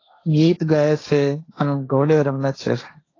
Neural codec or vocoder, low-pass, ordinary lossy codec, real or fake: codec, 16 kHz, 1.1 kbps, Voila-Tokenizer; 7.2 kHz; AAC, 32 kbps; fake